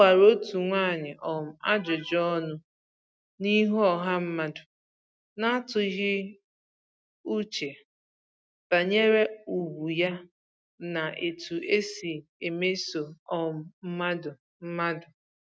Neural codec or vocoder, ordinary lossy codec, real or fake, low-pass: none; none; real; none